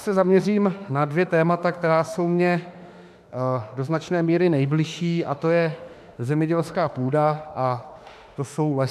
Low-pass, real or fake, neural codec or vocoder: 14.4 kHz; fake; autoencoder, 48 kHz, 32 numbers a frame, DAC-VAE, trained on Japanese speech